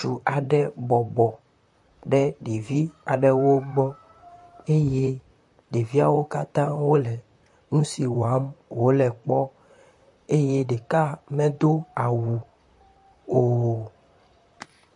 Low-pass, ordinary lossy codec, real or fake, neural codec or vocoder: 10.8 kHz; MP3, 48 kbps; fake; vocoder, 44.1 kHz, 128 mel bands, Pupu-Vocoder